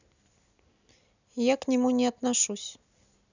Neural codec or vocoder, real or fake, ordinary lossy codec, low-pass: none; real; none; 7.2 kHz